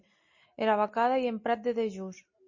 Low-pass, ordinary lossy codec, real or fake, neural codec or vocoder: 7.2 kHz; MP3, 32 kbps; real; none